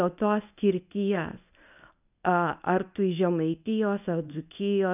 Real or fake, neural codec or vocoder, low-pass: fake; codec, 24 kHz, 0.9 kbps, WavTokenizer, medium speech release version 1; 3.6 kHz